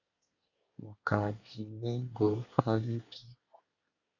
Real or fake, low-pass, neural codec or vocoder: fake; 7.2 kHz; codec, 24 kHz, 1 kbps, SNAC